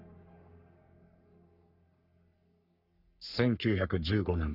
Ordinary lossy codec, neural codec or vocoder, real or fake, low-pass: none; codec, 44.1 kHz, 3.4 kbps, Pupu-Codec; fake; 5.4 kHz